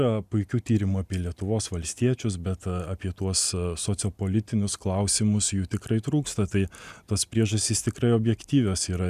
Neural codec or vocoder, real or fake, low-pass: none; real; 14.4 kHz